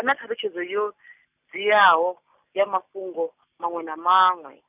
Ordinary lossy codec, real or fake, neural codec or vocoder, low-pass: none; real; none; 3.6 kHz